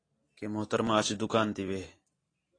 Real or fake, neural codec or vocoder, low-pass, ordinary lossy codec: real; none; 9.9 kHz; AAC, 32 kbps